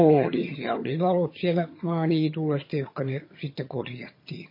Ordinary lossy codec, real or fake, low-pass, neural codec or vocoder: MP3, 24 kbps; fake; 5.4 kHz; vocoder, 22.05 kHz, 80 mel bands, HiFi-GAN